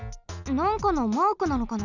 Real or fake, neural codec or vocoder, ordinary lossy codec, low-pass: real; none; none; 7.2 kHz